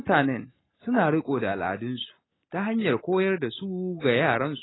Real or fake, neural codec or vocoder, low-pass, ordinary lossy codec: real; none; 7.2 kHz; AAC, 16 kbps